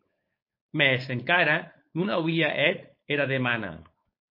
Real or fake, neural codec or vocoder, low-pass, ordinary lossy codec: fake; codec, 16 kHz, 4.8 kbps, FACodec; 5.4 kHz; MP3, 32 kbps